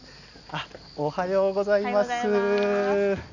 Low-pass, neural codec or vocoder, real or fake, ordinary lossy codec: 7.2 kHz; none; real; none